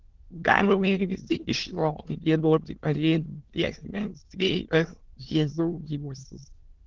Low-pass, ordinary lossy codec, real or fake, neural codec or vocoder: 7.2 kHz; Opus, 16 kbps; fake; autoencoder, 22.05 kHz, a latent of 192 numbers a frame, VITS, trained on many speakers